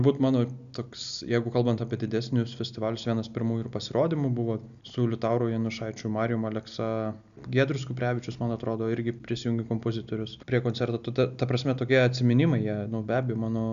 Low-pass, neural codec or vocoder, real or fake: 7.2 kHz; none; real